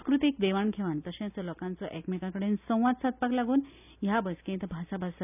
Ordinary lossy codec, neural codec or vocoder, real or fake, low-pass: none; none; real; 3.6 kHz